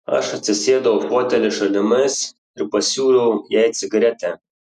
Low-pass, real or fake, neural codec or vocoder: 14.4 kHz; real; none